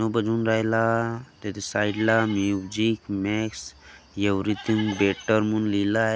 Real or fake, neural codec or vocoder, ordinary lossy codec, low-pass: real; none; none; none